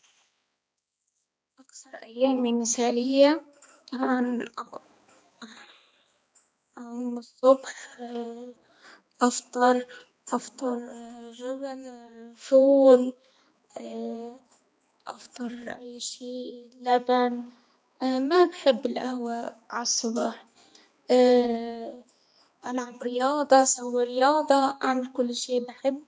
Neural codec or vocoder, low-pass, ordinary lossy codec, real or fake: codec, 16 kHz, 2 kbps, X-Codec, HuBERT features, trained on balanced general audio; none; none; fake